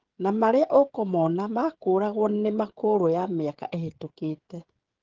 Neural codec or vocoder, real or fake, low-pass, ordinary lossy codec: codec, 16 kHz, 16 kbps, FreqCodec, smaller model; fake; 7.2 kHz; Opus, 16 kbps